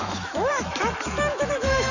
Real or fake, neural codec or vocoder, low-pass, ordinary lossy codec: fake; autoencoder, 48 kHz, 128 numbers a frame, DAC-VAE, trained on Japanese speech; 7.2 kHz; none